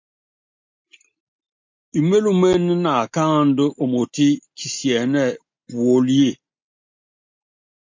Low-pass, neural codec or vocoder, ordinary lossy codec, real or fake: 7.2 kHz; none; MP3, 48 kbps; real